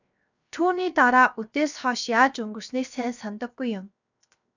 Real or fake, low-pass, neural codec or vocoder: fake; 7.2 kHz; codec, 16 kHz, 0.7 kbps, FocalCodec